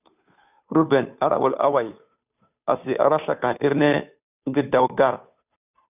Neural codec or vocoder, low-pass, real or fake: codec, 16 kHz, 2 kbps, FunCodec, trained on Chinese and English, 25 frames a second; 3.6 kHz; fake